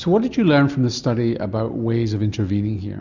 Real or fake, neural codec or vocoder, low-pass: real; none; 7.2 kHz